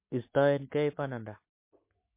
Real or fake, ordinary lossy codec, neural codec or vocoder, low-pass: fake; MP3, 24 kbps; codec, 44.1 kHz, 7.8 kbps, Pupu-Codec; 3.6 kHz